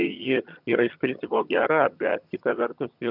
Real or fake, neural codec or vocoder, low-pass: fake; vocoder, 22.05 kHz, 80 mel bands, HiFi-GAN; 5.4 kHz